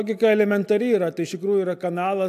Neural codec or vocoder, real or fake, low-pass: none; real; 14.4 kHz